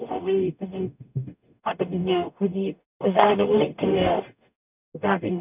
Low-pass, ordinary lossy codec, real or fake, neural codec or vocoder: 3.6 kHz; none; fake; codec, 44.1 kHz, 0.9 kbps, DAC